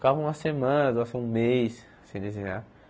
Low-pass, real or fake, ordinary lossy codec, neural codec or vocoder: none; real; none; none